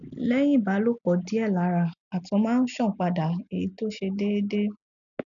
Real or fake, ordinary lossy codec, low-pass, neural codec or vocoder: real; none; 7.2 kHz; none